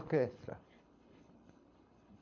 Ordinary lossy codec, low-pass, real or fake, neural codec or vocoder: MP3, 48 kbps; 7.2 kHz; fake; codec, 24 kHz, 6 kbps, HILCodec